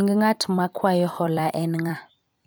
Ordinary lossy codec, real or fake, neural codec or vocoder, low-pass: none; fake; vocoder, 44.1 kHz, 128 mel bands every 256 samples, BigVGAN v2; none